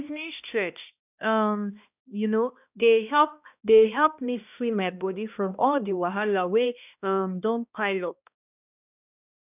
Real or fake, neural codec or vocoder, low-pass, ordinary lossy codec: fake; codec, 16 kHz, 1 kbps, X-Codec, HuBERT features, trained on balanced general audio; 3.6 kHz; none